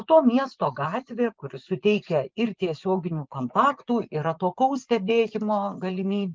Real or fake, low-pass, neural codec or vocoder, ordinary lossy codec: fake; 7.2 kHz; vocoder, 24 kHz, 100 mel bands, Vocos; Opus, 32 kbps